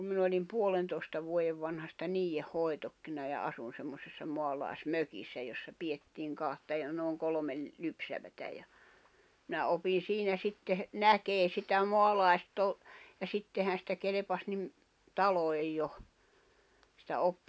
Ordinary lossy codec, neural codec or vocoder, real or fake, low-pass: none; none; real; none